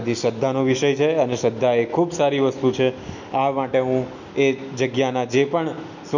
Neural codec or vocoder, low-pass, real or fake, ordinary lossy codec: none; 7.2 kHz; real; none